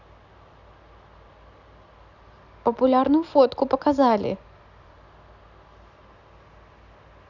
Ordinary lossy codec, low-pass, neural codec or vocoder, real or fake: none; 7.2 kHz; none; real